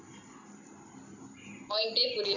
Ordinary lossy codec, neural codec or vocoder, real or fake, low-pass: none; none; real; 7.2 kHz